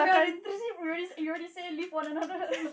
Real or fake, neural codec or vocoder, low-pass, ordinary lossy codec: real; none; none; none